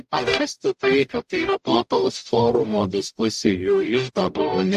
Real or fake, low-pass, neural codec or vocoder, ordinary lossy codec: fake; 14.4 kHz; codec, 44.1 kHz, 0.9 kbps, DAC; AAC, 96 kbps